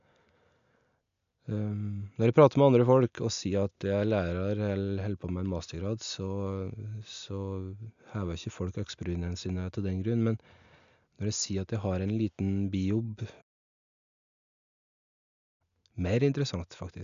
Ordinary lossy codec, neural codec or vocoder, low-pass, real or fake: AAC, 96 kbps; none; 7.2 kHz; real